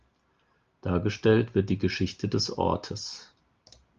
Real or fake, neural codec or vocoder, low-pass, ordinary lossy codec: real; none; 7.2 kHz; Opus, 32 kbps